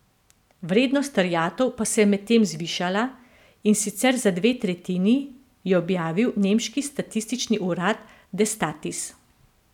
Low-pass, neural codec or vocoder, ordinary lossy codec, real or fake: 19.8 kHz; none; none; real